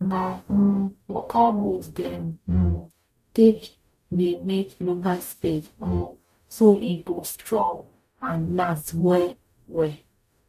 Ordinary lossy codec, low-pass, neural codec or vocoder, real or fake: none; 14.4 kHz; codec, 44.1 kHz, 0.9 kbps, DAC; fake